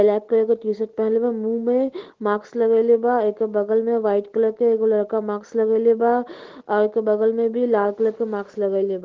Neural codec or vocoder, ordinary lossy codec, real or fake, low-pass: none; Opus, 16 kbps; real; 7.2 kHz